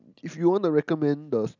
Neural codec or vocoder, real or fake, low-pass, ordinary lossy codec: none; real; 7.2 kHz; none